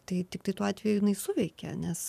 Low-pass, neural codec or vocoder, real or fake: 14.4 kHz; vocoder, 44.1 kHz, 128 mel bands every 512 samples, BigVGAN v2; fake